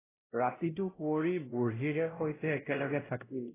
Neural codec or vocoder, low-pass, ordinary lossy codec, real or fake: codec, 16 kHz, 0.5 kbps, X-Codec, WavLM features, trained on Multilingual LibriSpeech; 3.6 kHz; AAC, 16 kbps; fake